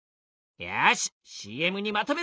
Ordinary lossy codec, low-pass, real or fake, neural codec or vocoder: none; none; real; none